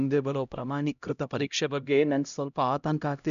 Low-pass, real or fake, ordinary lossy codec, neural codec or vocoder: 7.2 kHz; fake; none; codec, 16 kHz, 0.5 kbps, X-Codec, HuBERT features, trained on LibriSpeech